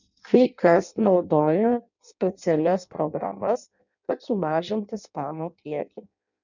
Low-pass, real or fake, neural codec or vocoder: 7.2 kHz; fake; codec, 16 kHz in and 24 kHz out, 0.6 kbps, FireRedTTS-2 codec